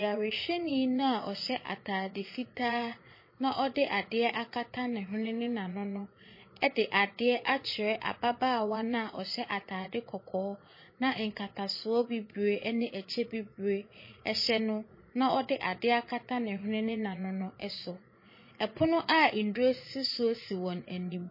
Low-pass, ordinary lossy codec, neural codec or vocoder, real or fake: 5.4 kHz; MP3, 24 kbps; vocoder, 22.05 kHz, 80 mel bands, Vocos; fake